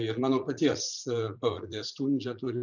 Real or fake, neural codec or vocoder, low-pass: fake; vocoder, 44.1 kHz, 80 mel bands, Vocos; 7.2 kHz